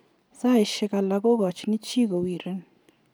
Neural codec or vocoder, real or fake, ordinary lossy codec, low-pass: none; real; none; none